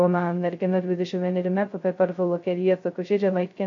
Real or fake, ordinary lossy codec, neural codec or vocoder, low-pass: fake; AAC, 48 kbps; codec, 16 kHz, 0.2 kbps, FocalCodec; 7.2 kHz